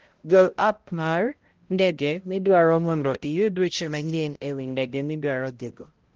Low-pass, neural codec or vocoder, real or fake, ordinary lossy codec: 7.2 kHz; codec, 16 kHz, 0.5 kbps, X-Codec, HuBERT features, trained on balanced general audio; fake; Opus, 16 kbps